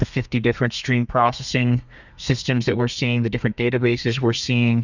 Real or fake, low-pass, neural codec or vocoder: fake; 7.2 kHz; codec, 32 kHz, 1.9 kbps, SNAC